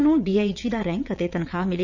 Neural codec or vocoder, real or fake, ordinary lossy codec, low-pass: vocoder, 22.05 kHz, 80 mel bands, WaveNeXt; fake; none; 7.2 kHz